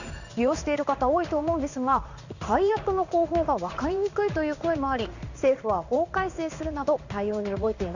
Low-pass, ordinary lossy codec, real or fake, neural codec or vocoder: 7.2 kHz; none; fake; codec, 16 kHz in and 24 kHz out, 1 kbps, XY-Tokenizer